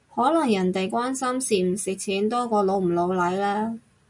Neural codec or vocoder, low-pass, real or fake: none; 10.8 kHz; real